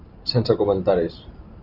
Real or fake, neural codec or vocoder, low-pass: fake; vocoder, 44.1 kHz, 128 mel bands every 512 samples, BigVGAN v2; 5.4 kHz